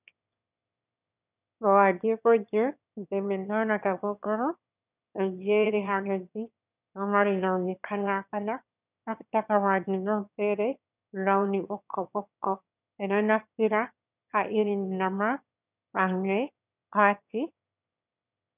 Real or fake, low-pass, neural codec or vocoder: fake; 3.6 kHz; autoencoder, 22.05 kHz, a latent of 192 numbers a frame, VITS, trained on one speaker